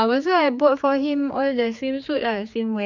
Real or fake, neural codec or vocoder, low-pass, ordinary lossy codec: fake; codec, 16 kHz, 4 kbps, X-Codec, HuBERT features, trained on general audio; 7.2 kHz; none